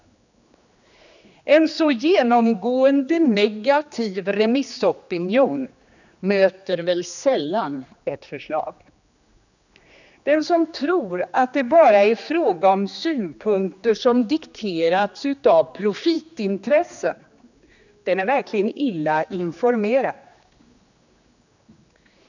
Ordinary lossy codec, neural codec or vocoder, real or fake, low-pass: none; codec, 16 kHz, 2 kbps, X-Codec, HuBERT features, trained on general audio; fake; 7.2 kHz